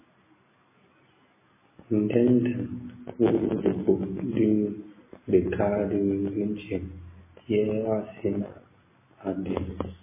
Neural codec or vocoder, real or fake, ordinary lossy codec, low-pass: none; real; MP3, 16 kbps; 3.6 kHz